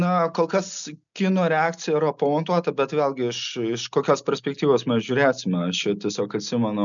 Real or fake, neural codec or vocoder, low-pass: real; none; 7.2 kHz